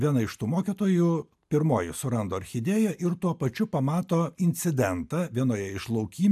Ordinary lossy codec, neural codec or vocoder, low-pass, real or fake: AAC, 96 kbps; none; 14.4 kHz; real